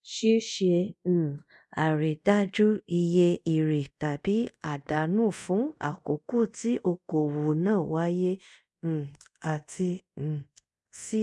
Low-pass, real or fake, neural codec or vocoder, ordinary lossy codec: none; fake; codec, 24 kHz, 0.5 kbps, DualCodec; none